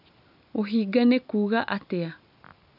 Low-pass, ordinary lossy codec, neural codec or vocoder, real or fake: 5.4 kHz; none; none; real